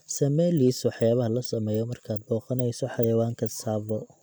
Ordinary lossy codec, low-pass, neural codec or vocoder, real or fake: none; none; none; real